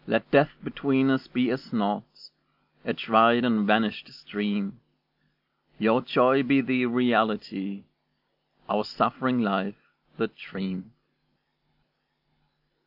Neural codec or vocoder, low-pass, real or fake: none; 5.4 kHz; real